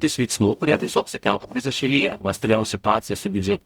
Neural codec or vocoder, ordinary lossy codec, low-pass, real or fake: codec, 44.1 kHz, 0.9 kbps, DAC; Opus, 64 kbps; 19.8 kHz; fake